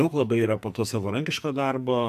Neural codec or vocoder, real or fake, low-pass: codec, 44.1 kHz, 2.6 kbps, SNAC; fake; 14.4 kHz